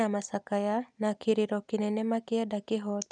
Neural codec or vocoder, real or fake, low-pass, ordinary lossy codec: none; real; 9.9 kHz; none